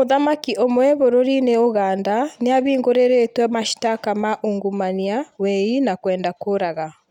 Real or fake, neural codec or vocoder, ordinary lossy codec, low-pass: real; none; none; 19.8 kHz